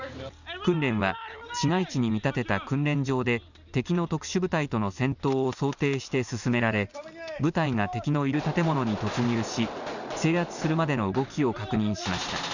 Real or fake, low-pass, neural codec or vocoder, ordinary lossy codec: real; 7.2 kHz; none; none